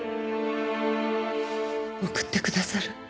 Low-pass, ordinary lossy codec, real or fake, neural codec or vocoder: none; none; real; none